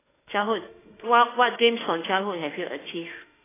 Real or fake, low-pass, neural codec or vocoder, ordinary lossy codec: fake; 3.6 kHz; autoencoder, 48 kHz, 32 numbers a frame, DAC-VAE, trained on Japanese speech; AAC, 16 kbps